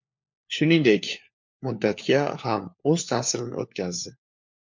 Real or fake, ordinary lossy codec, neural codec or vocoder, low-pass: fake; MP3, 64 kbps; codec, 16 kHz, 4 kbps, FunCodec, trained on LibriTTS, 50 frames a second; 7.2 kHz